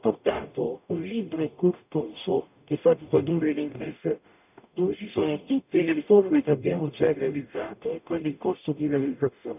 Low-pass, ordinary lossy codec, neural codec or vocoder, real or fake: 3.6 kHz; none; codec, 44.1 kHz, 0.9 kbps, DAC; fake